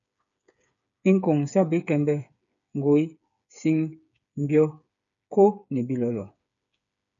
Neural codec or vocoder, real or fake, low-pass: codec, 16 kHz, 8 kbps, FreqCodec, smaller model; fake; 7.2 kHz